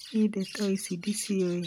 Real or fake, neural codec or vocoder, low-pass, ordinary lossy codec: real; none; 19.8 kHz; none